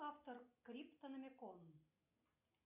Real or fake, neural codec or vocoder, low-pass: real; none; 3.6 kHz